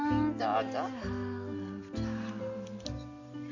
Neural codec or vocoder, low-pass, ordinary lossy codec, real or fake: none; 7.2 kHz; none; real